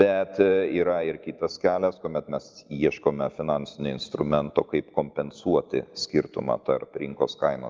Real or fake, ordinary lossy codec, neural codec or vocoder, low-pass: real; Opus, 24 kbps; none; 7.2 kHz